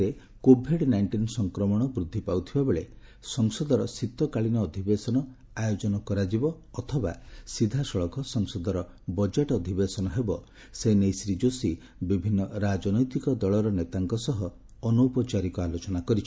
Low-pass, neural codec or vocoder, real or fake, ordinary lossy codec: none; none; real; none